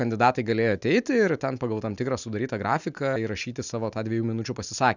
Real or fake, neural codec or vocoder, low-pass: real; none; 7.2 kHz